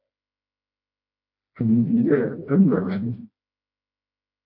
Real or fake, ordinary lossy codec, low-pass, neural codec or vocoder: fake; MP3, 32 kbps; 5.4 kHz; codec, 16 kHz, 1 kbps, FreqCodec, smaller model